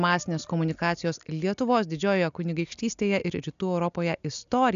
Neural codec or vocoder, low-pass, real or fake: none; 7.2 kHz; real